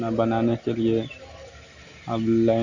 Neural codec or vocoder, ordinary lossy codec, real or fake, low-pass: none; Opus, 64 kbps; real; 7.2 kHz